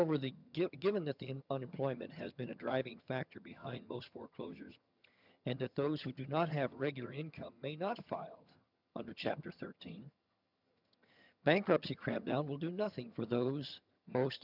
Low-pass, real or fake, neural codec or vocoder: 5.4 kHz; fake; vocoder, 22.05 kHz, 80 mel bands, HiFi-GAN